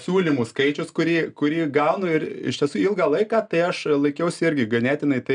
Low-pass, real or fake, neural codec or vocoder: 9.9 kHz; real; none